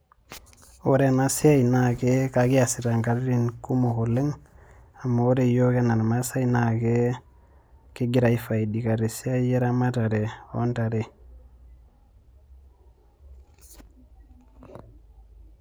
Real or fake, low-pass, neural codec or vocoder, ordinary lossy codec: real; none; none; none